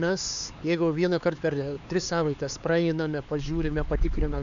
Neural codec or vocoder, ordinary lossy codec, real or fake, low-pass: codec, 16 kHz, 4 kbps, X-Codec, HuBERT features, trained on LibriSpeech; MP3, 96 kbps; fake; 7.2 kHz